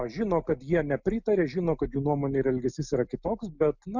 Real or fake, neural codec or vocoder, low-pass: real; none; 7.2 kHz